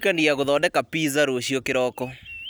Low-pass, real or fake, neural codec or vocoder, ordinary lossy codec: none; real; none; none